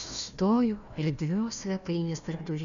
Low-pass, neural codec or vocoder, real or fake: 7.2 kHz; codec, 16 kHz, 1 kbps, FunCodec, trained on Chinese and English, 50 frames a second; fake